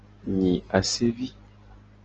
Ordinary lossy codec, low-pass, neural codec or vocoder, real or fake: Opus, 24 kbps; 7.2 kHz; none; real